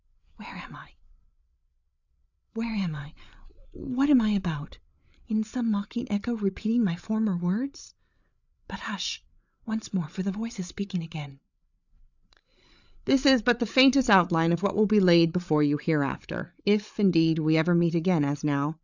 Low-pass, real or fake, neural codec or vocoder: 7.2 kHz; fake; codec, 16 kHz, 8 kbps, FreqCodec, larger model